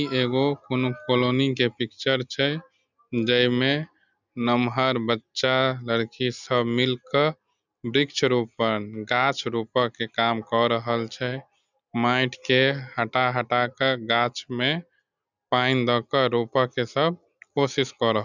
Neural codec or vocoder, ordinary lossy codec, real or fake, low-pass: none; none; real; 7.2 kHz